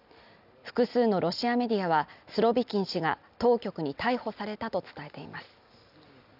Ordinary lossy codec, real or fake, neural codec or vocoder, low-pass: none; real; none; 5.4 kHz